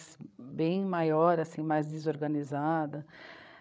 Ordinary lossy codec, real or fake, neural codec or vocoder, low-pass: none; fake; codec, 16 kHz, 16 kbps, FreqCodec, larger model; none